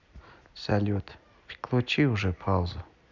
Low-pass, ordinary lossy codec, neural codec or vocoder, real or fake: 7.2 kHz; none; none; real